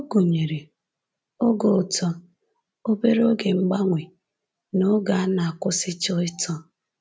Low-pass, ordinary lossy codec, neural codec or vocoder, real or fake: none; none; none; real